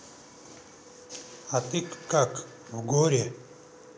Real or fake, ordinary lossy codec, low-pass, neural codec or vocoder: real; none; none; none